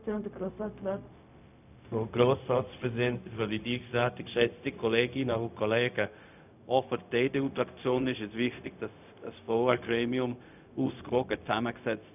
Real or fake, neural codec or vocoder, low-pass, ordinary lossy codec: fake; codec, 16 kHz, 0.4 kbps, LongCat-Audio-Codec; 3.6 kHz; none